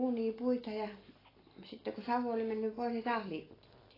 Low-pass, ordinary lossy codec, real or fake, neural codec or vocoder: 5.4 kHz; AAC, 24 kbps; real; none